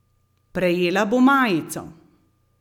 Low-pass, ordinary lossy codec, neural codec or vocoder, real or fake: 19.8 kHz; none; none; real